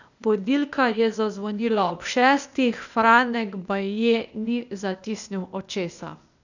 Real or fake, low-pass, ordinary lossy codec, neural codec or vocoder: fake; 7.2 kHz; none; codec, 16 kHz, 0.8 kbps, ZipCodec